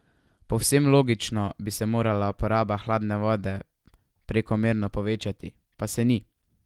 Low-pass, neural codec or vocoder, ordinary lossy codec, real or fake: 19.8 kHz; none; Opus, 24 kbps; real